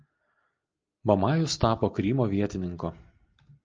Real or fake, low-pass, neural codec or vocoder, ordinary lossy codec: real; 7.2 kHz; none; Opus, 24 kbps